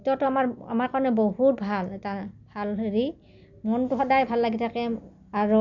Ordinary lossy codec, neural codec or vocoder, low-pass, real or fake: none; none; 7.2 kHz; real